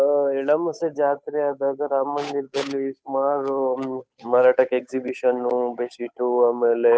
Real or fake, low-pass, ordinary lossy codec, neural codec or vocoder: fake; none; none; codec, 16 kHz, 8 kbps, FunCodec, trained on Chinese and English, 25 frames a second